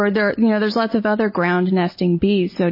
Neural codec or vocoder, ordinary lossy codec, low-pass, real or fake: none; MP3, 24 kbps; 5.4 kHz; real